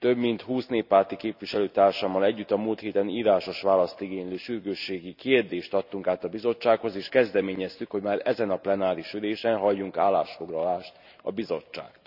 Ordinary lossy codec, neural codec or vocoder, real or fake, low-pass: none; none; real; 5.4 kHz